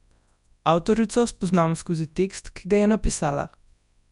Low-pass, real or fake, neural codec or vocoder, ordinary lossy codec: 10.8 kHz; fake; codec, 24 kHz, 0.9 kbps, WavTokenizer, large speech release; none